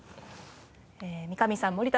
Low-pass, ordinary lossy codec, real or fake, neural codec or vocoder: none; none; real; none